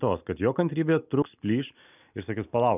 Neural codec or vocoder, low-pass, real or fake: none; 3.6 kHz; real